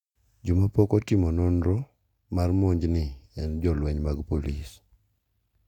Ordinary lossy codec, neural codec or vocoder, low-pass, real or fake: none; none; 19.8 kHz; real